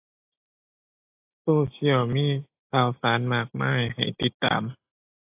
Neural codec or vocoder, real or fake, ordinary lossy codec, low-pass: none; real; AAC, 32 kbps; 3.6 kHz